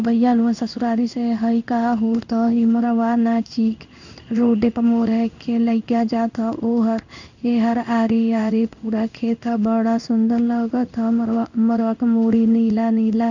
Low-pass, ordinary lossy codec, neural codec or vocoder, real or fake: 7.2 kHz; none; codec, 16 kHz in and 24 kHz out, 1 kbps, XY-Tokenizer; fake